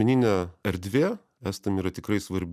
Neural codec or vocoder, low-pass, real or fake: none; 14.4 kHz; real